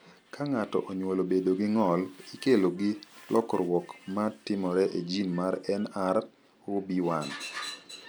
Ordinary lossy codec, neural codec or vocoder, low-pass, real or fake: none; none; none; real